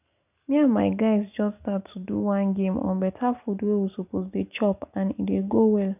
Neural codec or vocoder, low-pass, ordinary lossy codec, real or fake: none; 3.6 kHz; none; real